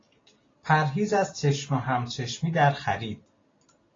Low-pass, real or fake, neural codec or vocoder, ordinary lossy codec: 7.2 kHz; real; none; AAC, 32 kbps